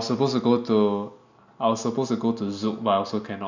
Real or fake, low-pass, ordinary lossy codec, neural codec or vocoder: real; 7.2 kHz; none; none